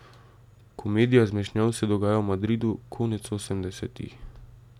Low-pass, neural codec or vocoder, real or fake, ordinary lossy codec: 19.8 kHz; none; real; none